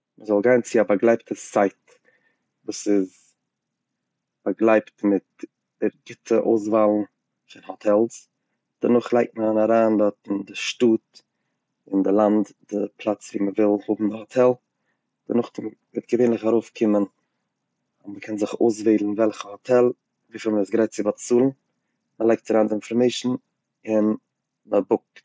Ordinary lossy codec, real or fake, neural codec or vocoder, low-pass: none; real; none; none